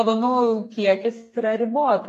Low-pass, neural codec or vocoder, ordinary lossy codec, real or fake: 14.4 kHz; codec, 32 kHz, 1.9 kbps, SNAC; AAC, 48 kbps; fake